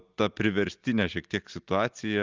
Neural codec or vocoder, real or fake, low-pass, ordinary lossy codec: none; real; 7.2 kHz; Opus, 24 kbps